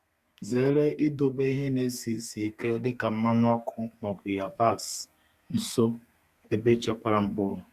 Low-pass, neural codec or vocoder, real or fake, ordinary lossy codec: 14.4 kHz; codec, 32 kHz, 1.9 kbps, SNAC; fake; Opus, 64 kbps